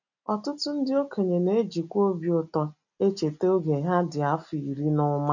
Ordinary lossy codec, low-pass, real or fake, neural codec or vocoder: none; 7.2 kHz; real; none